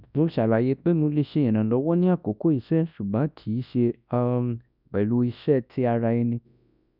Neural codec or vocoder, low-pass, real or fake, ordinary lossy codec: codec, 24 kHz, 0.9 kbps, WavTokenizer, large speech release; 5.4 kHz; fake; none